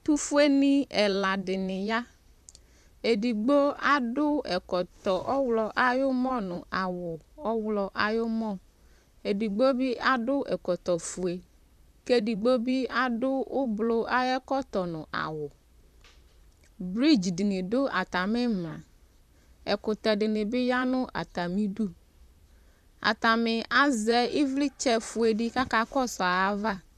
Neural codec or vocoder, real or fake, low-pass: vocoder, 44.1 kHz, 128 mel bands, Pupu-Vocoder; fake; 14.4 kHz